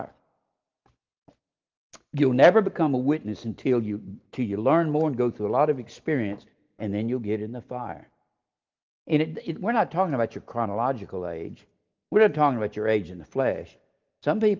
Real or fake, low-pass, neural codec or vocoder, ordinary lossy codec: real; 7.2 kHz; none; Opus, 32 kbps